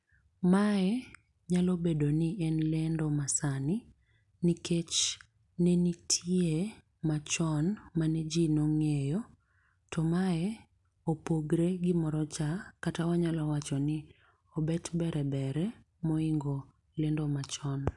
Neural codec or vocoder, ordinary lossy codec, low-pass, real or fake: none; none; 10.8 kHz; real